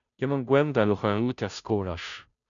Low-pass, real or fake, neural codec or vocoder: 7.2 kHz; fake; codec, 16 kHz, 0.5 kbps, FunCodec, trained on Chinese and English, 25 frames a second